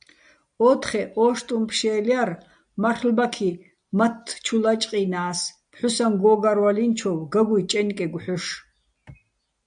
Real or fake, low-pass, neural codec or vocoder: real; 9.9 kHz; none